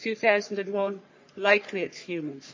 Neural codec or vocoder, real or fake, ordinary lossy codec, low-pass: codec, 44.1 kHz, 3.4 kbps, Pupu-Codec; fake; MP3, 32 kbps; 7.2 kHz